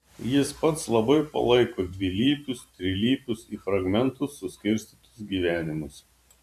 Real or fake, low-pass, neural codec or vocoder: fake; 14.4 kHz; vocoder, 44.1 kHz, 128 mel bands every 512 samples, BigVGAN v2